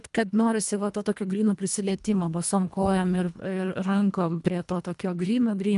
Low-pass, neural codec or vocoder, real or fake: 10.8 kHz; codec, 24 kHz, 1.5 kbps, HILCodec; fake